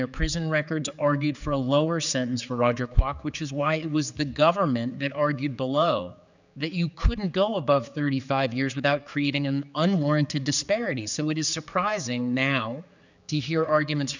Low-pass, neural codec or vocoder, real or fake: 7.2 kHz; codec, 16 kHz, 4 kbps, X-Codec, HuBERT features, trained on general audio; fake